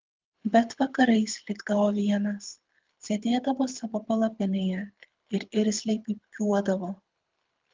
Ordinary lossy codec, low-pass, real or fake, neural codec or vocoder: Opus, 32 kbps; 7.2 kHz; fake; codec, 24 kHz, 6 kbps, HILCodec